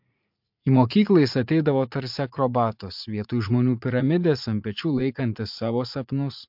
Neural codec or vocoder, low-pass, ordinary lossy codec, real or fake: vocoder, 44.1 kHz, 80 mel bands, Vocos; 5.4 kHz; MP3, 48 kbps; fake